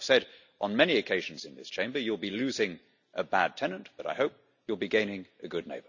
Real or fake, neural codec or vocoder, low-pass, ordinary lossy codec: real; none; 7.2 kHz; none